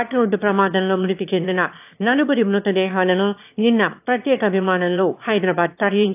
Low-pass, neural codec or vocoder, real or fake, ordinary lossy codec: 3.6 kHz; autoencoder, 22.05 kHz, a latent of 192 numbers a frame, VITS, trained on one speaker; fake; AAC, 32 kbps